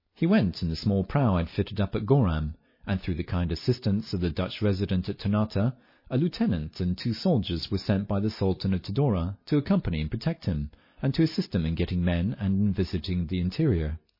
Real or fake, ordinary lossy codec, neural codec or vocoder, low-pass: real; MP3, 24 kbps; none; 5.4 kHz